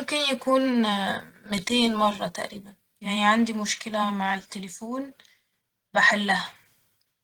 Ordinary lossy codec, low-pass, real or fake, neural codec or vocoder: Opus, 16 kbps; 19.8 kHz; fake; vocoder, 44.1 kHz, 128 mel bands every 512 samples, BigVGAN v2